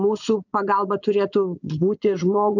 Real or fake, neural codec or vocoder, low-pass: fake; vocoder, 24 kHz, 100 mel bands, Vocos; 7.2 kHz